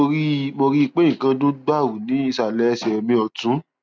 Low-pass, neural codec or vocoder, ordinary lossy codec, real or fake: none; none; none; real